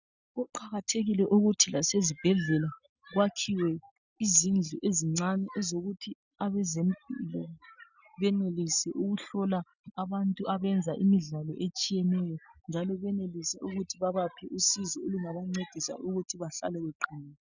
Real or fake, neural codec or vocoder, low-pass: real; none; 7.2 kHz